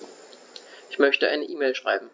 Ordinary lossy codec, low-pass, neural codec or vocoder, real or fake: none; none; none; real